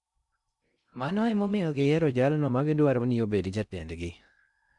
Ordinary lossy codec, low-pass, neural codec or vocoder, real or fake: none; 10.8 kHz; codec, 16 kHz in and 24 kHz out, 0.6 kbps, FocalCodec, streaming, 2048 codes; fake